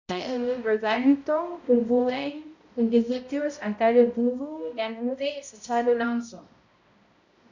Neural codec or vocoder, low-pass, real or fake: codec, 16 kHz, 0.5 kbps, X-Codec, HuBERT features, trained on balanced general audio; 7.2 kHz; fake